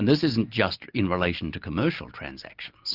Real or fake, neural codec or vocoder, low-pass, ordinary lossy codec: real; none; 5.4 kHz; Opus, 32 kbps